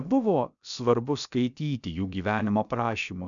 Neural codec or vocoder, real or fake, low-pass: codec, 16 kHz, about 1 kbps, DyCAST, with the encoder's durations; fake; 7.2 kHz